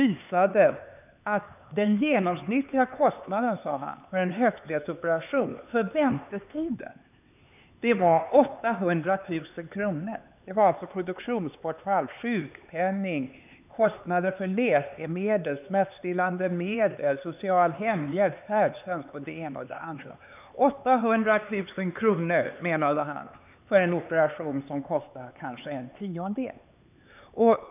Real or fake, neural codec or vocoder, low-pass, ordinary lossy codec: fake; codec, 16 kHz, 4 kbps, X-Codec, HuBERT features, trained on LibriSpeech; 3.6 kHz; none